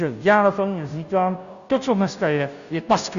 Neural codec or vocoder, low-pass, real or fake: codec, 16 kHz, 0.5 kbps, FunCodec, trained on Chinese and English, 25 frames a second; 7.2 kHz; fake